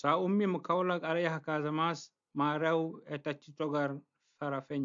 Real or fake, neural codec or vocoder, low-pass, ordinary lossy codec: real; none; 7.2 kHz; AAC, 64 kbps